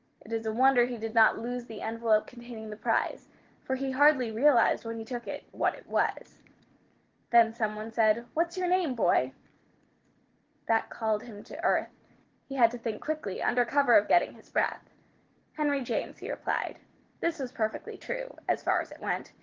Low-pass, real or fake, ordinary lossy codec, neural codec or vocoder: 7.2 kHz; real; Opus, 16 kbps; none